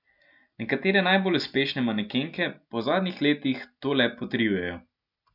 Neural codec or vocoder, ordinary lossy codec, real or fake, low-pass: none; none; real; 5.4 kHz